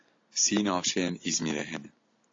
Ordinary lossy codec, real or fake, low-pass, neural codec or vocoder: AAC, 32 kbps; real; 7.2 kHz; none